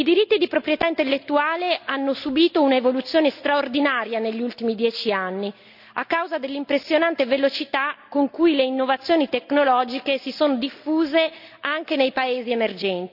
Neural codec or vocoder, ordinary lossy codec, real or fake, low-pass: none; none; real; 5.4 kHz